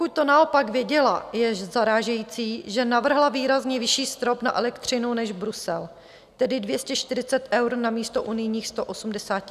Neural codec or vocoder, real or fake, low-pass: none; real; 14.4 kHz